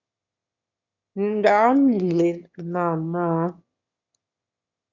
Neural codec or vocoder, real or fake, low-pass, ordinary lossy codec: autoencoder, 22.05 kHz, a latent of 192 numbers a frame, VITS, trained on one speaker; fake; 7.2 kHz; Opus, 64 kbps